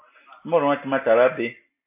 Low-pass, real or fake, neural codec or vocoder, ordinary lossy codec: 3.6 kHz; real; none; MP3, 24 kbps